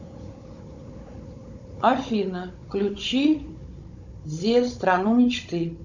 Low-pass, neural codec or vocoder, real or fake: 7.2 kHz; codec, 16 kHz, 16 kbps, FunCodec, trained on Chinese and English, 50 frames a second; fake